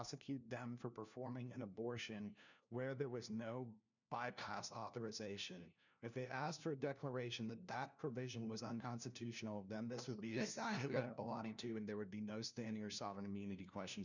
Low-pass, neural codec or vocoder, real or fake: 7.2 kHz; codec, 16 kHz, 1 kbps, FunCodec, trained on LibriTTS, 50 frames a second; fake